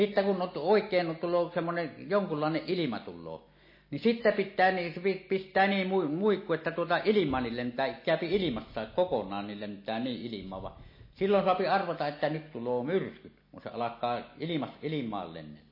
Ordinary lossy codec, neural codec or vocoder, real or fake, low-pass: MP3, 24 kbps; none; real; 5.4 kHz